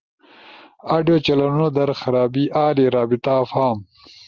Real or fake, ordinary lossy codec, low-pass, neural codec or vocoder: real; Opus, 24 kbps; 7.2 kHz; none